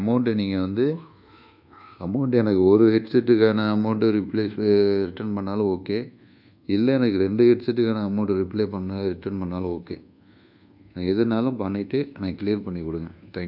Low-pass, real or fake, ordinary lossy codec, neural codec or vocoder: 5.4 kHz; fake; none; codec, 24 kHz, 1.2 kbps, DualCodec